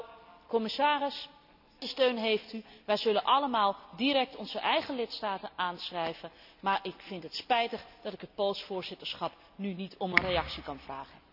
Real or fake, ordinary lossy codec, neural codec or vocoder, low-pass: real; none; none; 5.4 kHz